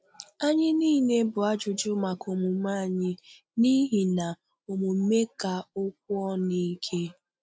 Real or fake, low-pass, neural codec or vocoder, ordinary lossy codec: real; none; none; none